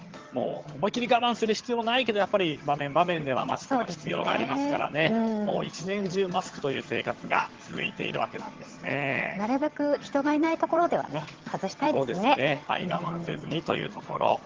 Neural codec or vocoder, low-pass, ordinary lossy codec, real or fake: vocoder, 22.05 kHz, 80 mel bands, HiFi-GAN; 7.2 kHz; Opus, 16 kbps; fake